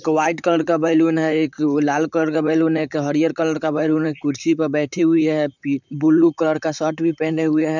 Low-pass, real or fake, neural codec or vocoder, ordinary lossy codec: 7.2 kHz; fake; vocoder, 44.1 kHz, 128 mel bands, Pupu-Vocoder; none